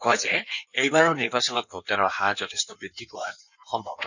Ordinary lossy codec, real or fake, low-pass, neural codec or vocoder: none; fake; 7.2 kHz; codec, 16 kHz in and 24 kHz out, 1.1 kbps, FireRedTTS-2 codec